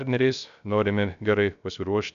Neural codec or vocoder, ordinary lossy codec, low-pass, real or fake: codec, 16 kHz, 0.3 kbps, FocalCodec; MP3, 96 kbps; 7.2 kHz; fake